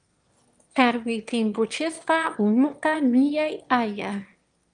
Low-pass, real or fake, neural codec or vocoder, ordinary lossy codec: 9.9 kHz; fake; autoencoder, 22.05 kHz, a latent of 192 numbers a frame, VITS, trained on one speaker; Opus, 32 kbps